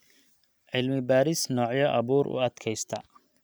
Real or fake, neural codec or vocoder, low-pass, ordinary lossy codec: real; none; none; none